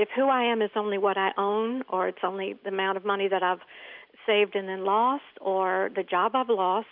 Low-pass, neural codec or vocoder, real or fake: 5.4 kHz; none; real